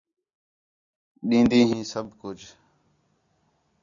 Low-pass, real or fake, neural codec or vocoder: 7.2 kHz; real; none